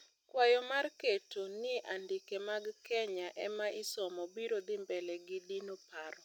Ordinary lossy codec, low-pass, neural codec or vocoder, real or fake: none; 19.8 kHz; none; real